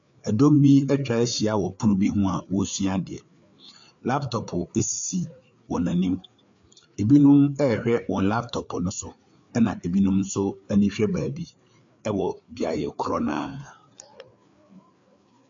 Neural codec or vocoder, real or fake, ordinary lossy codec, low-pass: codec, 16 kHz, 4 kbps, FreqCodec, larger model; fake; AAC, 64 kbps; 7.2 kHz